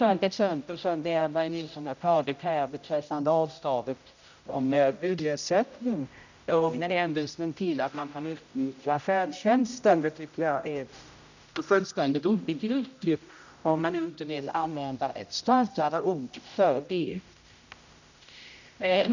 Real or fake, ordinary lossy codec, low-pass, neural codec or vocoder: fake; none; 7.2 kHz; codec, 16 kHz, 0.5 kbps, X-Codec, HuBERT features, trained on general audio